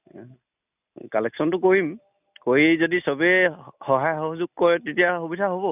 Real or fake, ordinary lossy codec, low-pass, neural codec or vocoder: real; none; 3.6 kHz; none